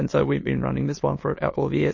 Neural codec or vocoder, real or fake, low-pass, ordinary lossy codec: autoencoder, 22.05 kHz, a latent of 192 numbers a frame, VITS, trained on many speakers; fake; 7.2 kHz; MP3, 32 kbps